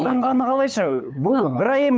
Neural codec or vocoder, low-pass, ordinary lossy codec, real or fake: codec, 16 kHz, 8 kbps, FunCodec, trained on LibriTTS, 25 frames a second; none; none; fake